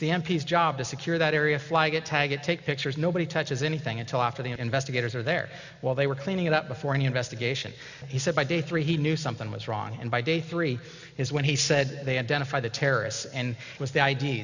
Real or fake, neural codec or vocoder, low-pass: real; none; 7.2 kHz